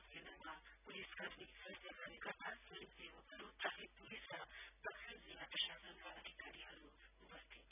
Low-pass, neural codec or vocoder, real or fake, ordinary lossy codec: 3.6 kHz; none; real; none